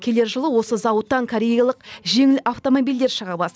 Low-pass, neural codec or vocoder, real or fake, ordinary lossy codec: none; none; real; none